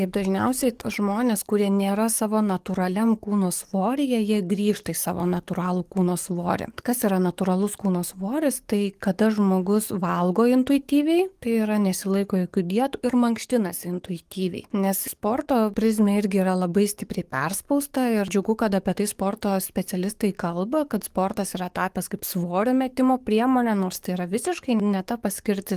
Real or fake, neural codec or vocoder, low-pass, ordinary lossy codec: fake; codec, 44.1 kHz, 7.8 kbps, DAC; 14.4 kHz; Opus, 24 kbps